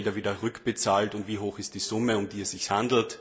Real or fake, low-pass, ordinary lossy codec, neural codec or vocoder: real; none; none; none